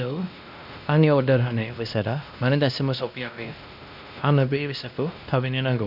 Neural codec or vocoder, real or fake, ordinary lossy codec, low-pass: codec, 16 kHz, 1 kbps, X-Codec, WavLM features, trained on Multilingual LibriSpeech; fake; none; 5.4 kHz